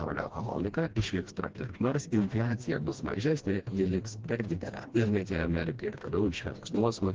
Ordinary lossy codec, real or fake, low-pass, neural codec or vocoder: Opus, 16 kbps; fake; 7.2 kHz; codec, 16 kHz, 1 kbps, FreqCodec, smaller model